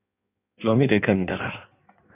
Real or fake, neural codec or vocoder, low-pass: fake; codec, 16 kHz in and 24 kHz out, 1.1 kbps, FireRedTTS-2 codec; 3.6 kHz